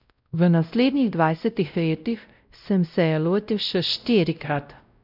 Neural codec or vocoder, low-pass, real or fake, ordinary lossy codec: codec, 16 kHz, 0.5 kbps, X-Codec, WavLM features, trained on Multilingual LibriSpeech; 5.4 kHz; fake; none